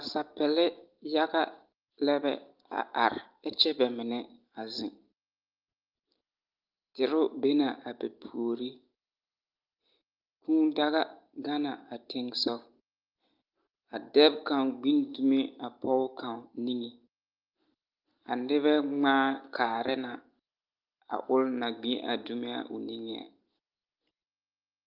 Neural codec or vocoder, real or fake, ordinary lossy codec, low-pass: none; real; Opus, 32 kbps; 5.4 kHz